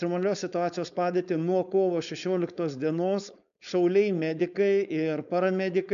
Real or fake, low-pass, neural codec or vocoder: fake; 7.2 kHz; codec, 16 kHz, 4.8 kbps, FACodec